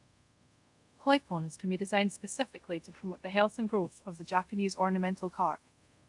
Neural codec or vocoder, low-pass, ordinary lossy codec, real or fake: codec, 24 kHz, 0.5 kbps, DualCodec; 10.8 kHz; none; fake